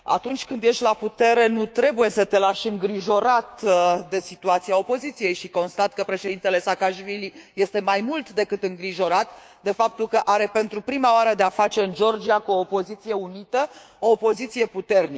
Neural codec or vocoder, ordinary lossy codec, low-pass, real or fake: codec, 16 kHz, 6 kbps, DAC; none; none; fake